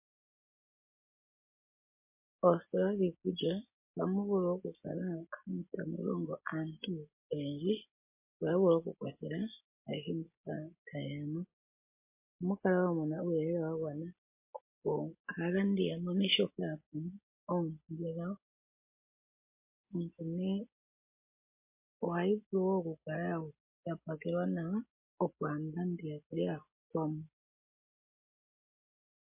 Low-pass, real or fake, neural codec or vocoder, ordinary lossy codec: 3.6 kHz; real; none; MP3, 24 kbps